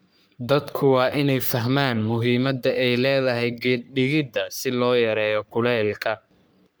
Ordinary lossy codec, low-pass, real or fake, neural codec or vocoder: none; none; fake; codec, 44.1 kHz, 3.4 kbps, Pupu-Codec